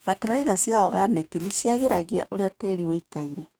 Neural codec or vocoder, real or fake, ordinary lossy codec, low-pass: codec, 44.1 kHz, 2.6 kbps, DAC; fake; none; none